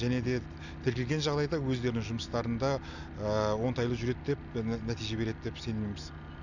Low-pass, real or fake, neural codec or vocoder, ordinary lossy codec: 7.2 kHz; real; none; none